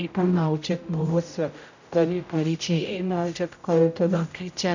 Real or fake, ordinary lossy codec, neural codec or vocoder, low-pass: fake; none; codec, 16 kHz, 0.5 kbps, X-Codec, HuBERT features, trained on general audio; 7.2 kHz